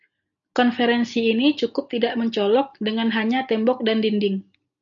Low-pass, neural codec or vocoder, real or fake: 7.2 kHz; none; real